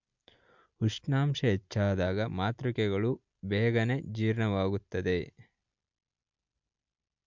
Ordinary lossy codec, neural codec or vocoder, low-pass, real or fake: MP3, 64 kbps; none; 7.2 kHz; real